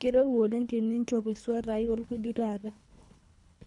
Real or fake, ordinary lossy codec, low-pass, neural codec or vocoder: fake; none; 10.8 kHz; codec, 24 kHz, 3 kbps, HILCodec